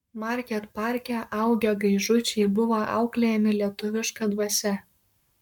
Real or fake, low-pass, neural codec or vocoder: fake; 19.8 kHz; codec, 44.1 kHz, 7.8 kbps, Pupu-Codec